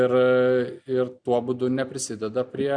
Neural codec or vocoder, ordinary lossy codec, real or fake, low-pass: none; Opus, 64 kbps; real; 9.9 kHz